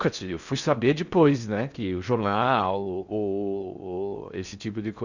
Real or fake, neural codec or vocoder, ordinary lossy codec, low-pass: fake; codec, 16 kHz in and 24 kHz out, 0.6 kbps, FocalCodec, streaming, 4096 codes; none; 7.2 kHz